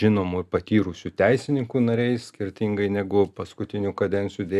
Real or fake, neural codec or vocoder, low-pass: real; none; 14.4 kHz